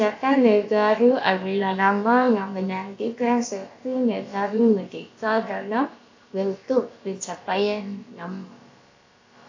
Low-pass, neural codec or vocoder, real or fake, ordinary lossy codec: 7.2 kHz; codec, 16 kHz, about 1 kbps, DyCAST, with the encoder's durations; fake; AAC, 48 kbps